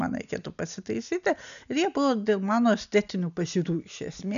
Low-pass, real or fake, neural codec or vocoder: 7.2 kHz; real; none